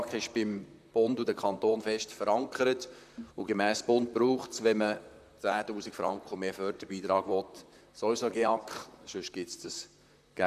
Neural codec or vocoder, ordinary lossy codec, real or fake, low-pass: vocoder, 44.1 kHz, 128 mel bands, Pupu-Vocoder; none; fake; 14.4 kHz